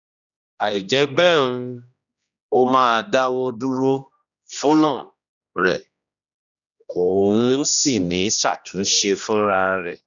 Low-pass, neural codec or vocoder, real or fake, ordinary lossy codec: 7.2 kHz; codec, 16 kHz, 1 kbps, X-Codec, HuBERT features, trained on general audio; fake; none